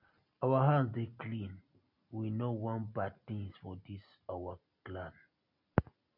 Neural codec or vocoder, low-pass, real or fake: none; 5.4 kHz; real